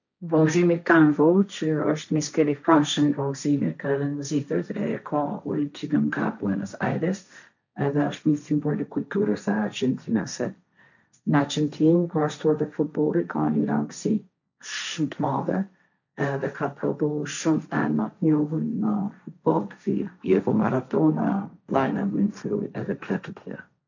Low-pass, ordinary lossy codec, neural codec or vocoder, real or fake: 7.2 kHz; none; codec, 16 kHz, 1.1 kbps, Voila-Tokenizer; fake